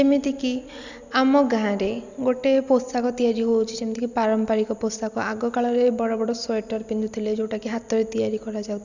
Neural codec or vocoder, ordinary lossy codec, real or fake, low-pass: none; none; real; 7.2 kHz